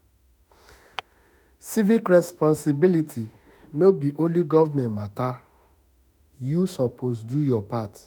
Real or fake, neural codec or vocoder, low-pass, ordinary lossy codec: fake; autoencoder, 48 kHz, 32 numbers a frame, DAC-VAE, trained on Japanese speech; none; none